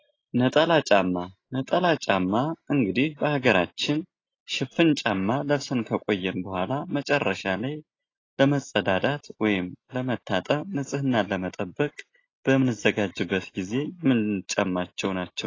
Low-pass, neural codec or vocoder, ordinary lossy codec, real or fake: 7.2 kHz; none; AAC, 32 kbps; real